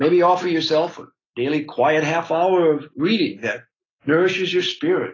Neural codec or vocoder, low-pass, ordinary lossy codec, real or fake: none; 7.2 kHz; AAC, 32 kbps; real